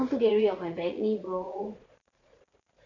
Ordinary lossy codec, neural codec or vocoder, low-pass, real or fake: none; vocoder, 44.1 kHz, 80 mel bands, Vocos; 7.2 kHz; fake